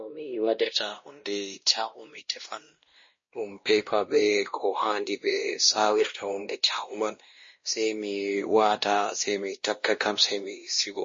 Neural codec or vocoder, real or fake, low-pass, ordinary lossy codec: codec, 16 kHz, 1 kbps, X-Codec, WavLM features, trained on Multilingual LibriSpeech; fake; 7.2 kHz; MP3, 32 kbps